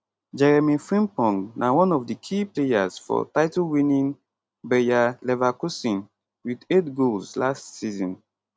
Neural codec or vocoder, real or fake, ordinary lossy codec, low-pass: none; real; none; none